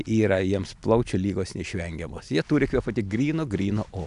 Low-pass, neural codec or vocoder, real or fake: 10.8 kHz; none; real